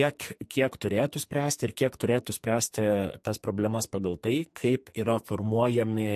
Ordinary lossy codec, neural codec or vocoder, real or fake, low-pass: MP3, 64 kbps; codec, 44.1 kHz, 2.6 kbps, SNAC; fake; 14.4 kHz